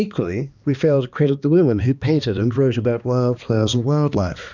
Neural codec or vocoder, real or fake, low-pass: codec, 16 kHz, 2 kbps, X-Codec, HuBERT features, trained on balanced general audio; fake; 7.2 kHz